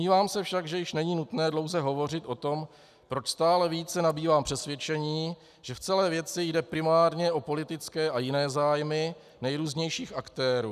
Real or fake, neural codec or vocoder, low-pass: real; none; 14.4 kHz